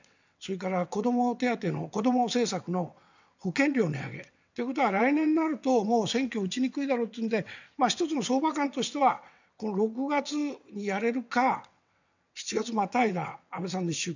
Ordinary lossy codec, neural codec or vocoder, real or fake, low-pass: none; vocoder, 22.05 kHz, 80 mel bands, WaveNeXt; fake; 7.2 kHz